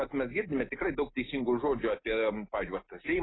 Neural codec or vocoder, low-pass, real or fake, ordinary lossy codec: none; 7.2 kHz; real; AAC, 16 kbps